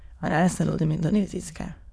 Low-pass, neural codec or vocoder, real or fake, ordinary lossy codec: none; autoencoder, 22.05 kHz, a latent of 192 numbers a frame, VITS, trained on many speakers; fake; none